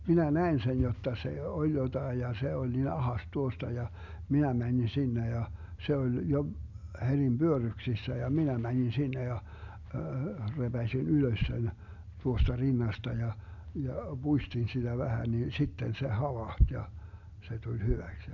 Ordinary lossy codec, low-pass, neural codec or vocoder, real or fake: none; 7.2 kHz; none; real